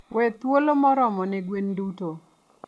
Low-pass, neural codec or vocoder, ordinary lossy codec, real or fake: none; none; none; real